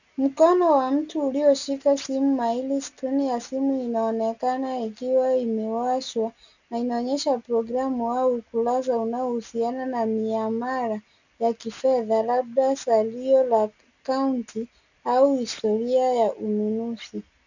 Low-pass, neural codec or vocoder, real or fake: 7.2 kHz; none; real